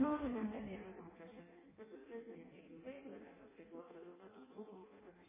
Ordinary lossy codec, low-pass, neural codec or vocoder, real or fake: MP3, 16 kbps; 3.6 kHz; codec, 16 kHz in and 24 kHz out, 0.6 kbps, FireRedTTS-2 codec; fake